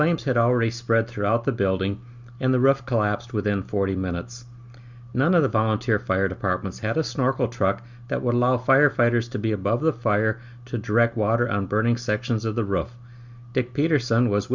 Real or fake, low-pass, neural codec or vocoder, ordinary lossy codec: real; 7.2 kHz; none; Opus, 64 kbps